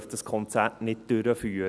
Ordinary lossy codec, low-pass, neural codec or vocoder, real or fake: none; none; none; real